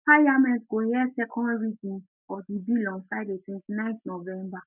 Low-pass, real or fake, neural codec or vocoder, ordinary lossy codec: 3.6 kHz; real; none; none